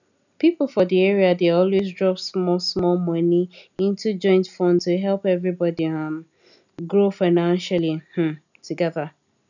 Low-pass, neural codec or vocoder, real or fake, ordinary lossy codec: 7.2 kHz; none; real; none